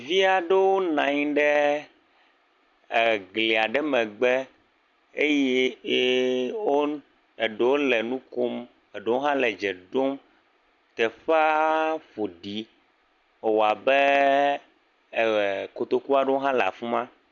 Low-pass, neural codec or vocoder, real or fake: 7.2 kHz; none; real